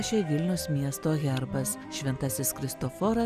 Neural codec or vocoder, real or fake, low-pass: none; real; 14.4 kHz